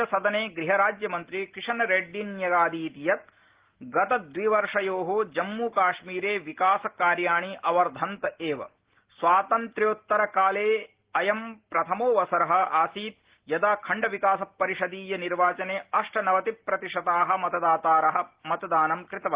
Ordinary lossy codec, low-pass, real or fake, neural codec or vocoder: Opus, 16 kbps; 3.6 kHz; real; none